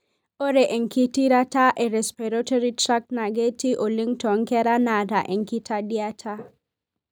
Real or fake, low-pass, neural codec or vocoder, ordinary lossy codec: real; none; none; none